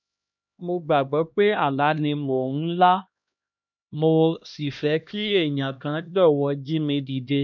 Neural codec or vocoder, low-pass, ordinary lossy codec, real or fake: codec, 16 kHz, 1 kbps, X-Codec, HuBERT features, trained on LibriSpeech; 7.2 kHz; none; fake